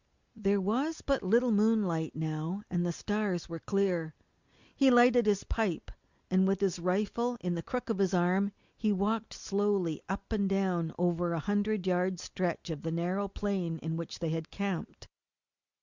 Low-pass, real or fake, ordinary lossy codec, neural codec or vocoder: 7.2 kHz; real; Opus, 64 kbps; none